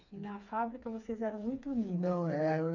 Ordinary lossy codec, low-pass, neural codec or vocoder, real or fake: none; 7.2 kHz; codec, 16 kHz, 4 kbps, FreqCodec, smaller model; fake